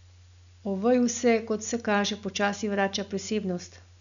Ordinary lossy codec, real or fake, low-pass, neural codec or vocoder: none; real; 7.2 kHz; none